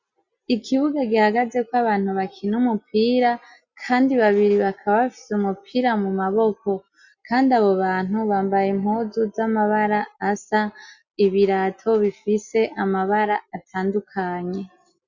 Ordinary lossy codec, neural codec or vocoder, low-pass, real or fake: Opus, 64 kbps; none; 7.2 kHz; real